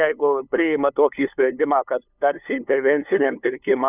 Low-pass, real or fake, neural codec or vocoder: 3.6 kHz; fake; codec, 16 kHz, 2 kbps, FunCodec, trained on LibriTTS, 25 frames a second